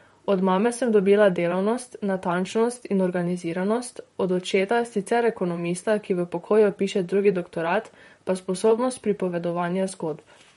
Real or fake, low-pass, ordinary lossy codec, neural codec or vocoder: fake; 19.8 kHz; MP3, 48 kbps; vocoder, 44.1 kHz, 128 mel bands, Pupu-Vocoder